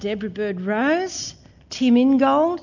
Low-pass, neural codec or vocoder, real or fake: 7.2 kHz; none; real